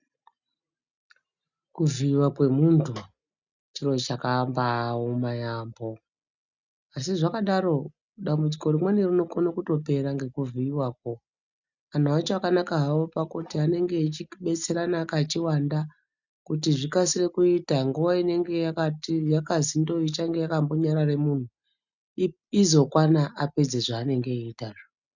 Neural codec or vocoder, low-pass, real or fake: none; 7.2 kHz; real